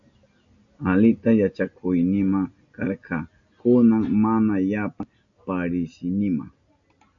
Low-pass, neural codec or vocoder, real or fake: 7.2 kHz; none; real